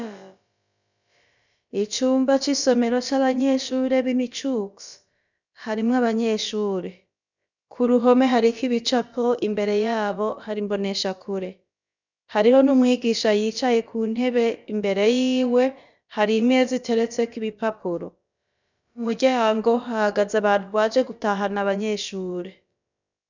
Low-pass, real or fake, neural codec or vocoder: 7.2 kHz; fake; codec, 16 kHz, about 1 kbps, DyCAST, with the encoder's durations